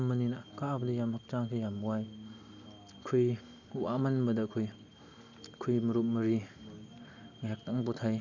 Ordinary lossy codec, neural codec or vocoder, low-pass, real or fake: none; none; 7.2 kHz; real